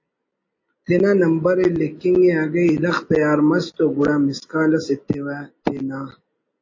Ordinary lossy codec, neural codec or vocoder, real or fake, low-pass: MP3, 32 kbps; none; real; 7.2 kHz